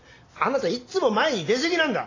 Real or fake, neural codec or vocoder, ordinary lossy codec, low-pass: real; none; AAC, 32 kbps; 7.2 kHz